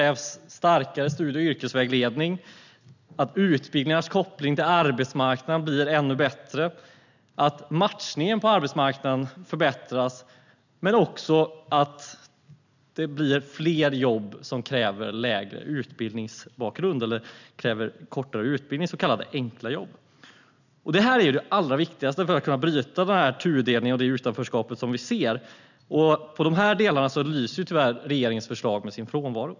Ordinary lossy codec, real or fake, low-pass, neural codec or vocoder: none; real; 7.2 kHz; none